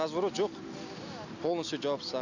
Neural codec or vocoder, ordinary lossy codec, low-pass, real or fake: none; none; 7.2 kHz; real